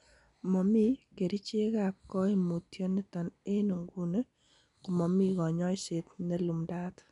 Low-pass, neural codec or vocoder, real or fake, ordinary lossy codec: 10.8 kHz; none; real; none